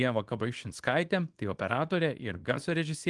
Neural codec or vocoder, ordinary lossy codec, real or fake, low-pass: codec, 24 kHz, 0.9 kbps, WavTokenizer, small release; Opus, 24 kbps; fake; 10.8 kHz